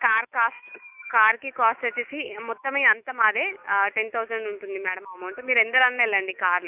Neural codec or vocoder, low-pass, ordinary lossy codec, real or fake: autoencoder, 48 kHz, 128 numbers a frame, DAC-VAE, trained on Japanese speech; 3.6 kHz; none; fake